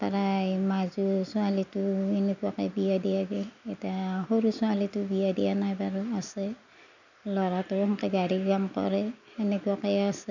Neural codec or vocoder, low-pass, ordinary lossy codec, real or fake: none; 7.2 kHz; none; real